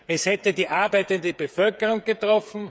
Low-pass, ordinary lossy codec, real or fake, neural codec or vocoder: none; none; fake; codec, 16 kHz, 8 kbps, FreqCodec, smaller model